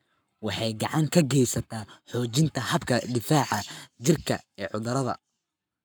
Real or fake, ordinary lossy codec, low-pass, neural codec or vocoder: fake; none; none; codec, 44.1 kHz, 7.8 kbps, Pupu-Codec